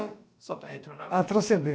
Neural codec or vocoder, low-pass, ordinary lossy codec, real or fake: codec, 16 kHz, about 1 kbps, DyCAST, with the encoder's durations; none; none; fake